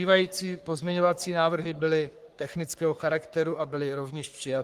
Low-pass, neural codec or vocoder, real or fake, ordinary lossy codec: 14.4 kHz; codec, 44.1 kHz, 3.4 kbps, Pupu-Codec; fake; Opus, 24 kbps